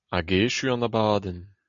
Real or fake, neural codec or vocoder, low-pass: real; none; 7.2 kHz